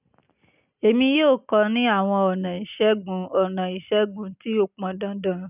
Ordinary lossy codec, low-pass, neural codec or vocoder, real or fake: none; 3.6 kHz; none; real